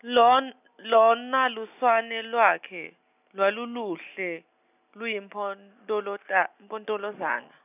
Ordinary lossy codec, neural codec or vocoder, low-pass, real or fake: none; none; 3.6 kHz; real